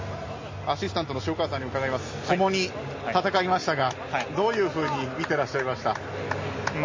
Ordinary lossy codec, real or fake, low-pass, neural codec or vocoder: MP3, 32 kbps; real; 7.2 kHz; none